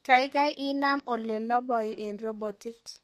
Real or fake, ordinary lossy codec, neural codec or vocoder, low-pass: fake; MP3, 64 kbps; codec, 32 kHz, 1.9 kbps, SNAC; 14.4 kHz